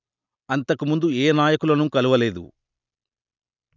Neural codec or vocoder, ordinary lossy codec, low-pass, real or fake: none; none; 7.2 kHz; real